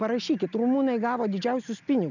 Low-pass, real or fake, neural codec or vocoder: 7.2 kHz; real; none